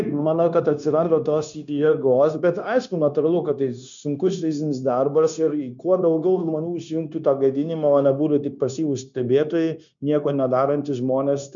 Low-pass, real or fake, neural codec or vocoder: 7.2 kHz; fake; codec, 16 kHz, 0.9 kbps, LongCat-Audio-Codec